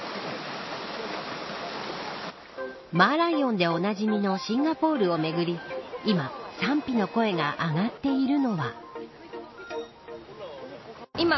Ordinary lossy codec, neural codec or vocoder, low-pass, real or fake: MP3, 24 kbps; none; 7.2 kHz; real